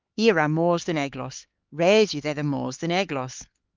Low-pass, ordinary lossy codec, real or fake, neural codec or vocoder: 7.2 kHz; Opus, 24 kbps; fake; codec, 16 kHz, 4 kbps, X-Codec, HuBERT features, trained on LibriSpeech